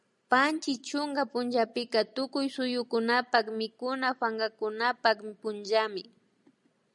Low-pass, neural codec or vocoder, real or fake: 10.8 kHz; none; real